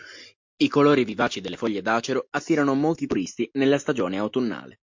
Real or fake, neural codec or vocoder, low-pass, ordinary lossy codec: real; none; 7.2 kHz; MP3, 48 kbps